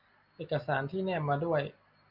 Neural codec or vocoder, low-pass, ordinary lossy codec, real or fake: none; 5.4 kHz; Opus, 64 kbps; real